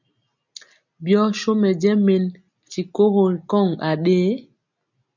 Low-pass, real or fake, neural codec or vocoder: 7.2 kHz; real; none